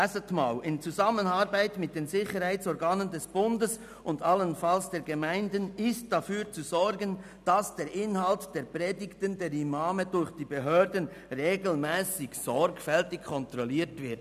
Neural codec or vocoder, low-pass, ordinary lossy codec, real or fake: none; 14.4 kHz; none; real